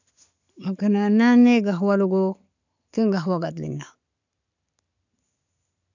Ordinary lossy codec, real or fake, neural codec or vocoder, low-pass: none; real; none; 7.2 kHz